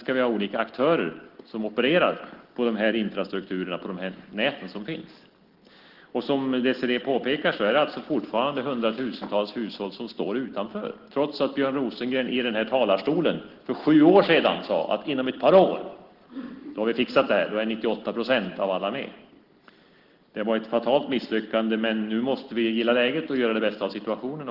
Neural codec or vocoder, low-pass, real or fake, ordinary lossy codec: none; 5.4 kHz; real; Opus, 16 kbps